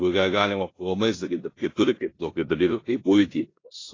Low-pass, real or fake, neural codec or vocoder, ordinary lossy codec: 7.2 kHz; fake; codec, 16 kHz in and 24 kHz out, 0.9 kbps, LongCat-Audio-Codec, four codebook decoder; AAC, 32 kbps